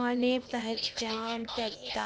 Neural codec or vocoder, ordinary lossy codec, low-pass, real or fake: codec, 16 kHz, 0.8 kbps, ZipCodec; none; none; fake